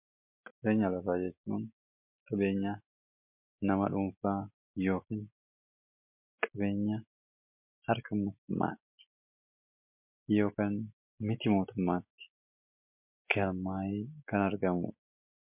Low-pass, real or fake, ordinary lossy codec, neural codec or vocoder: 3.6 kHz; real; MP3, 32 kbps; none